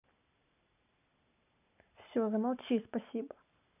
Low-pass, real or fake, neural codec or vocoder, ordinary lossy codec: 3.6 kHz; real; none; none